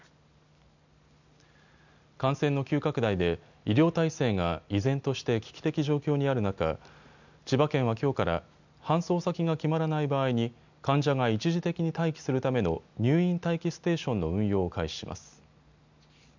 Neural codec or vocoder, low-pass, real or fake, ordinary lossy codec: none; 7.2 kHz; real; none